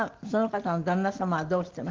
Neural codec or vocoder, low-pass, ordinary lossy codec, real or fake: none; 7.2 kHz; Opus, 16 kbps; real